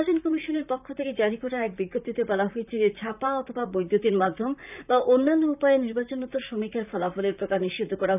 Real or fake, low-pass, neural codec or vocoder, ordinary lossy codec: fake; 3.6 kHz; vocoder, 44.1 kHz, 128 mel bands, Pupu-Vocoder; none